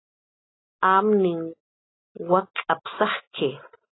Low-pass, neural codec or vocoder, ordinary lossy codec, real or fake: 7.2 kHz; none; AAC, 16 kbps; real